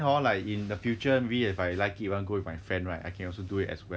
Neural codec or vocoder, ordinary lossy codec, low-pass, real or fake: none; none; none; real